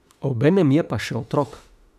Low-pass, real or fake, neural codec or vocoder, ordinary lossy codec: 14.4 kHz; fake; autoencoder, 48 kHz, 32 numbers a frame, DAC-VAE, trained on Japanese speech; none